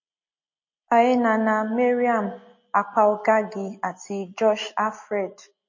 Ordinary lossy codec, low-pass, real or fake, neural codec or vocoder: MP3, 32 kbps; 7.2 kHz; real; none